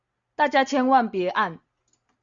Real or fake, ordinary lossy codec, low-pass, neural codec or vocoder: real; Opus, 64 kbps; 7.2 kHz; none